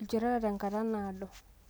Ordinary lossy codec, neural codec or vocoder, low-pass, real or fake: none; none; none; real